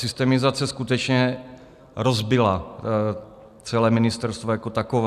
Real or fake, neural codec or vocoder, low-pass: real; none; 14.4 kHz